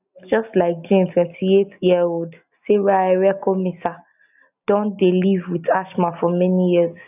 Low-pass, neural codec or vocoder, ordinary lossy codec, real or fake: 3.6 kHz; none; none; real